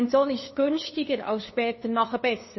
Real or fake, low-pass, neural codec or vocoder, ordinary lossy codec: fake; 7.2 kHz; codec, 16 kHz, 2 kbps, FunCodec, trained on LibriTTS, 25 frames a second; MP3, 24 kbps